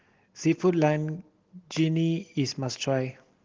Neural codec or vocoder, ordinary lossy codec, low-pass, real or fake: none; Opus, 16 kbps; 7.2 kHz; real